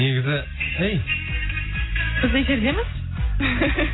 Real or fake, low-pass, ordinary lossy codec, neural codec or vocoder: real; 7.2 kHz; AAC, 16 kbps; none